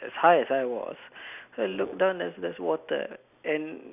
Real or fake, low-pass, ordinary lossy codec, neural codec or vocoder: real; 3.6 kHz; none; none